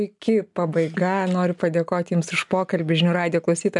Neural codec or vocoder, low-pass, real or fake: none; 10.8 kHz; real